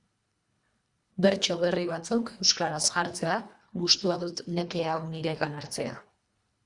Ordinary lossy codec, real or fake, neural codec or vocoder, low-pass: Opus, 64 kbps; fake; codec, 24 kHz, 1.5 kbps, HILCodec; 10.8 kHz